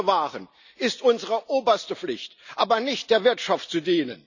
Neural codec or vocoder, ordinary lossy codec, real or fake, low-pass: none; none; real; 7.2 kHz